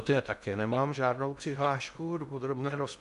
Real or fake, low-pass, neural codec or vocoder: fake; 10.8 kHz; codec, 16 kHz in and 24 kHz out, 0.6 kbps, FocalCodec, streaming, 2048 codes